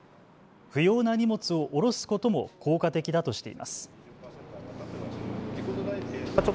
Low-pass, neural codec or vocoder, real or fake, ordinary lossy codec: none; none; real; none